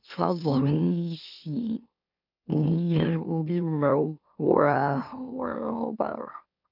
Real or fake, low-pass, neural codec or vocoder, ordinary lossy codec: fake; 5.4 kHz; autoencoder, 44.1 kHz, a latent of 192 numbers a frame, MeloTTS; none